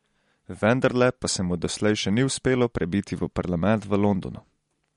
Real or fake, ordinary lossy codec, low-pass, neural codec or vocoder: real; MP3, 48 kbps; 19.8 kHz; none